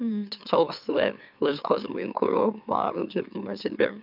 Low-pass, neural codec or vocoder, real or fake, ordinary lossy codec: 5.4 kHz; autoencoder, 44.1 kHz, a latent of 192 numbers a frame, MeloTTS; fake; none